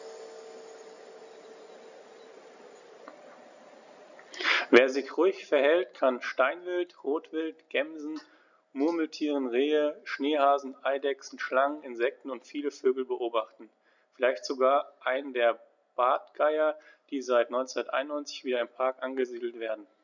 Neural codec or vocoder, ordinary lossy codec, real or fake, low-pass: none; none; real; 7.2 kHz